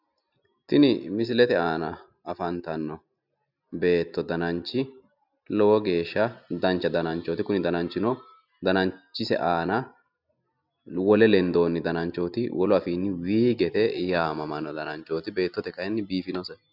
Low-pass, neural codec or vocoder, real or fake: 5.4 kHz; none; real